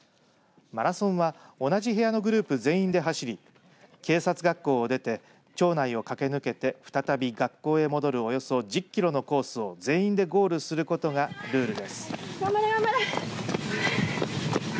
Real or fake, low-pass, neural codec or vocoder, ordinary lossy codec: real; none; none; none